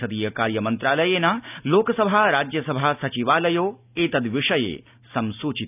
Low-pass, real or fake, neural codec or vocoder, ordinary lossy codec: 3.6 kHz; real; none; none